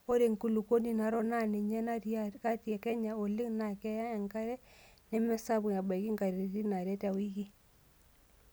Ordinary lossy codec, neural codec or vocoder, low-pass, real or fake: none; none; none; real